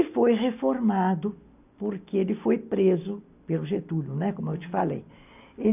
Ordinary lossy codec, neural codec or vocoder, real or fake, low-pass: none; none; real; 3.6 kHz